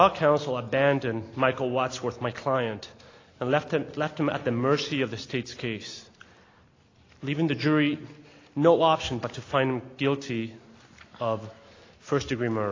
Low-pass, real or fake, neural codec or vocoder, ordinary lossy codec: 7.2 kHz; real; none; AAC, 32 kbps